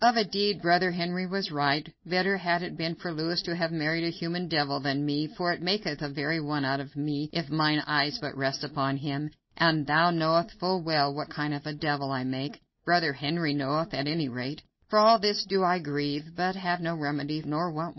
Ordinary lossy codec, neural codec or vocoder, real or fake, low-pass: MP3, 24 kbps; none; real; 7.2 kHz